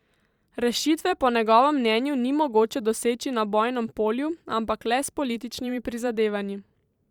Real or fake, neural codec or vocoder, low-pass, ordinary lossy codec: fake; vocoder, 44.1 kHz, 128 mel bands every 512 samples, BigVGAN v2; 19.8 kHz; Opus, 64 kbps